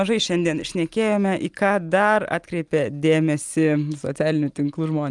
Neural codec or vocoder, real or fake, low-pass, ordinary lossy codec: none; real; 10.8 kHz; Opus, 32 kbps